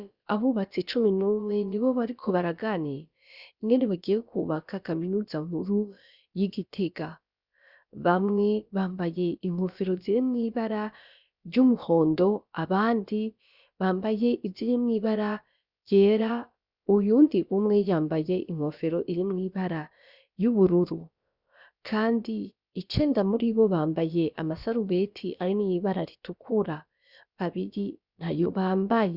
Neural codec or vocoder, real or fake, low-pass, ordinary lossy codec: codec, 16 kHz, about 1 kbps, DyCAST, with the encoder's durations; fake; 5.4 kHz; Opus, 64 kbps